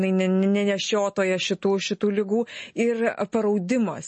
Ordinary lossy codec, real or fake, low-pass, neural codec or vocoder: MP3, 32 kbps; real; 10.8 kHz; none